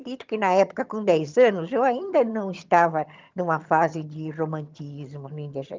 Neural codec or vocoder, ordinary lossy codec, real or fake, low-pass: vocoder, 22.05 kHz, 80 mel bands, HiFi-GAN; Opus, 24 kbps; fake; 7.2 kHz